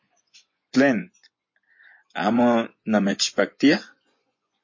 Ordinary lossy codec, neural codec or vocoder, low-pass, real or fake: MP3, 32 kbps; vocoder, 24 kHz, 100 mel bands, Vocos; 7.2 kHz; fake